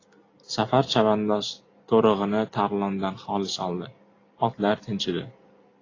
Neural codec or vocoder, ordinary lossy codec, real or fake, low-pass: none; AAC, 32 kbps; real; 7.2 kHz